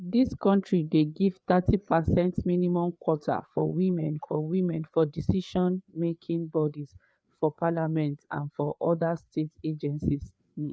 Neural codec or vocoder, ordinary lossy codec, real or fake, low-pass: codec, 16 kHz, 4 kbps, FreqCodec, larger model; none; fake; none